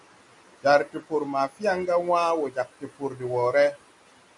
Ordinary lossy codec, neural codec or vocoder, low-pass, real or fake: MP3, 96 kbps; none; 10.8 kHz; real